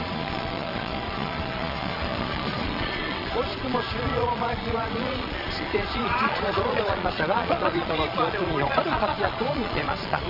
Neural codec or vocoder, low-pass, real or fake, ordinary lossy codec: vocoder, 22.05 kHz, 80 mel bands, Vocos; 5.4 kHz; fake; none